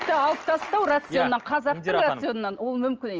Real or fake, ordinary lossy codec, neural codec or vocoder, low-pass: real; Opus, 24 kbps; none; 7.2 kHz